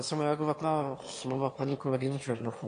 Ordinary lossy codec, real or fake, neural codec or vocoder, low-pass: AAC, 48 kbps; fake; autoencoder, 22.05 kHz, a latent of 192 numbers a frame, VITS, trained on one speaker; 9.9 kHz